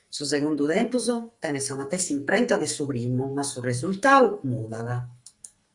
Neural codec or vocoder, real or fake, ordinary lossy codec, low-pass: codec, 44.1 kHz, 2.6 kbps, SNAC; fake; Opus, 64 kbps; 10.8 kHz